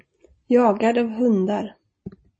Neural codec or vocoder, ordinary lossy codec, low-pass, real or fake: none; MP3, 32 kbps; 9.9 kHz; real